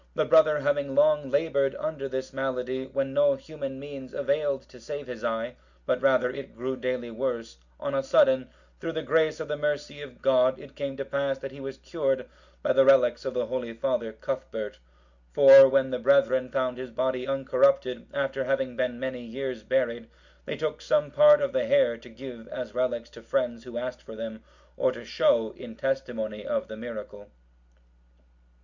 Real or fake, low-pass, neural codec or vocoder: real; 7.2 kHz; none